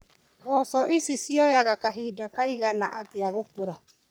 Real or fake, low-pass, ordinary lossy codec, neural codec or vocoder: fake; none; none; codec, 44.1 kHz, 3.4 kbps, Pupu-Codec